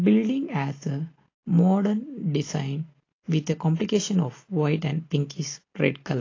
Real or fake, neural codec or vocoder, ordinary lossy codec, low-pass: real; none; AAC, 32 kbps; 7.2 kHz